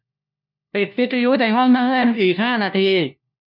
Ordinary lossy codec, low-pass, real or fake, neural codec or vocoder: none; 5.4 kHz; fake; codec, 16 kHz, 1 kbps, FunCodec, trained on LibriTTS, 50 frames a second